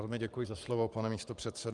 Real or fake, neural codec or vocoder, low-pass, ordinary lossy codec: fake; vocoder, 44.1 kHz, 128 mel bands every 512 samples, BigVGAN v2; 10.8 kHz; Opus, 32 kbps